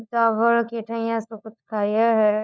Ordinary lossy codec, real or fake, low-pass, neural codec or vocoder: none; fake; none; codec, 16 kHz, 4 kbps, FunCodec, trained on LibriTTS, 50 frames a second